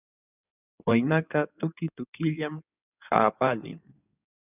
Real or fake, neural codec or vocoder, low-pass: fake; codec, 16 kHz in and 24 kHz out, 2.2 kbps, FireRedTTS-2 codec; 3.6 kHz